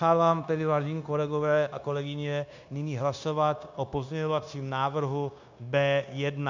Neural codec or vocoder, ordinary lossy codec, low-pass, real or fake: codec, 16 kHz, 0.9 kbps, LongCat-Audio-Codec; MP3, 64 kbps; 7.2 kHz; fake